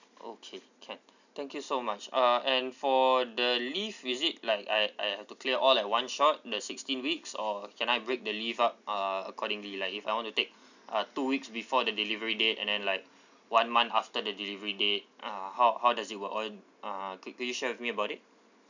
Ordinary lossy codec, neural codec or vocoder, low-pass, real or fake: none; none; 7.2 kHz; real